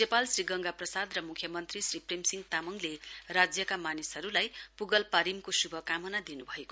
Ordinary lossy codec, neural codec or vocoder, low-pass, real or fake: none; none; none; real